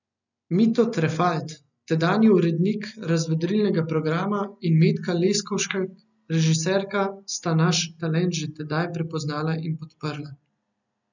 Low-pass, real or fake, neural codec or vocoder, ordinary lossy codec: 7.2 kHz; real; none; none